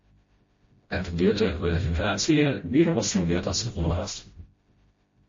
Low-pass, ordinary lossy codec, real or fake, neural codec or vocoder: 7.2 kHz; MP3, 32 kbps; fake; codec, 16 kHz, 0.5 kbps, FreqCodec, smaller model